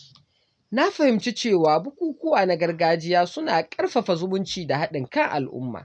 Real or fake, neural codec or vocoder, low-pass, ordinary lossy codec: real; none; 9.9 kHz; none